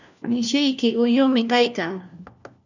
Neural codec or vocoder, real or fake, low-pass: codec, 16 kHz, 1 kbps, FunCodec, trained on LibriTTS, 50 frames a second; fake; 7.2 kHz